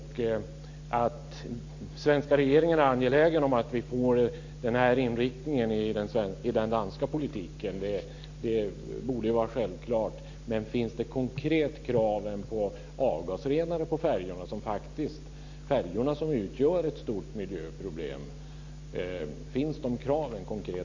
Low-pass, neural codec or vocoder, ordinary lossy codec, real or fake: 7.2 kHz; none; AAC, 48 kbps; real